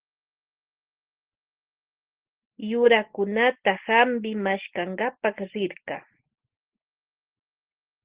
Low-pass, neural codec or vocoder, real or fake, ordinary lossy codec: 3.6 kHz; none; real; Opus, 16 kbps